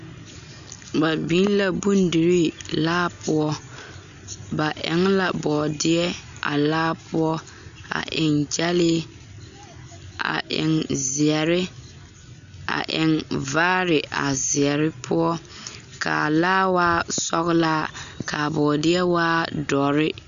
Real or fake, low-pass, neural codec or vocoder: real; 7.2 kHz; none